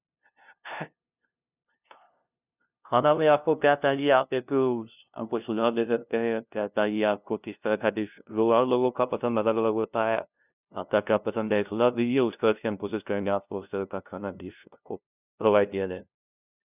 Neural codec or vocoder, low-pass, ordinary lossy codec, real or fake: codec, 16 kHz, 0.5 kbps, FunCodec, trained on LibriTTS, 25 frames a second; 3.6 kHz; none; fake